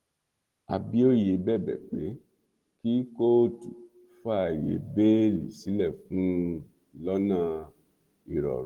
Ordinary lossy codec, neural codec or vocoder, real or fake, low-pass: Opus, 16 kbps; none; real; 19.8 kHz